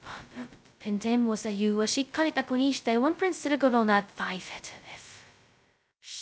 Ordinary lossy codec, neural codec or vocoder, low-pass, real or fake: none; codec, 16 kHz, 0.2 kbps, FocalCodec; none; fake